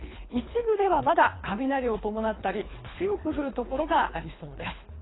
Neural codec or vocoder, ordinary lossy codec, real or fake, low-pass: codec, 24 kHz, 3 kbps, HILCodec; AAC, 16 kbps; fake; 7.2 kHz